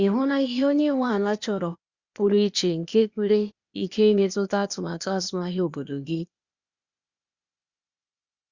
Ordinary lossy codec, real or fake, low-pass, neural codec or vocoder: Opus, 64 kbps; fake; 7.2 kHz; codec, 16 kHz, 0.8 kbps, ZipCodec